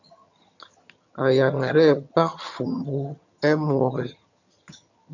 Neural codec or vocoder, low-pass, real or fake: vocoder, 22.05 kHz, 80 mel bands, HiFi-GAN; 7.2 kHz; fake